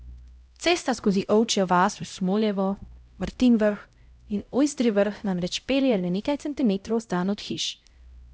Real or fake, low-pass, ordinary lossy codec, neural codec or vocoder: fake; none; none; codec, 16 kHz, 0.5 kbps, X-Codec, HuBERT features, trained on LibriSpeech